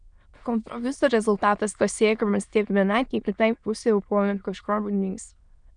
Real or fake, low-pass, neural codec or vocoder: fake; 9.9 kHz; autoencoder, 22.05 kHz, a latent of 192 numbers a frame, VITS, trained on many speakers